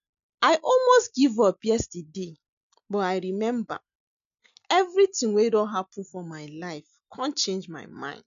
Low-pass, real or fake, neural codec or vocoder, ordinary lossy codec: 7.2 kHz; real; none; none